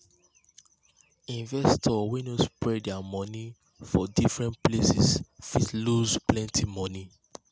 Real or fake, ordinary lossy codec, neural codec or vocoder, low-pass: real; none; none; none